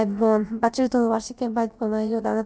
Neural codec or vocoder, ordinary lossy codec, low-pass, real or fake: codec, 16 kHz, about 1 kbps, DyCAST, with the encoder's durations; none; none; fake